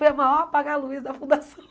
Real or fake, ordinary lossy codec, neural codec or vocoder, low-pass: real; none; none; none